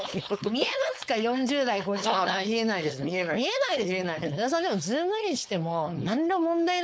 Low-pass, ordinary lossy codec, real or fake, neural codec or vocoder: none; none; fake; codec, 16 kHz, 4.8 kbps, FACodec